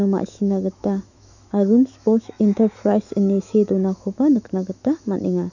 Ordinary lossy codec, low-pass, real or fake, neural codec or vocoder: none; 7.2 kHz; fake; autoencoder, 48 kHz, 128 numbers a frame, DAC-VAE, trained on Japanese speech